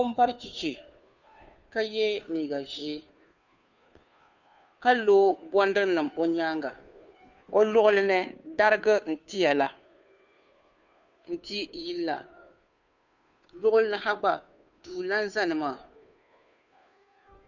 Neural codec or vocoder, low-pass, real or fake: codec, 16 kHz, 2 kbps, FunCodec, trained on Chinese and English, 25 frames a second; 7.2 kHz; fake